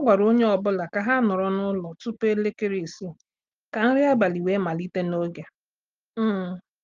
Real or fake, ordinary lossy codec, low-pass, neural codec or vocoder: real; Opus, 32 kbps; 7.2 kHz; none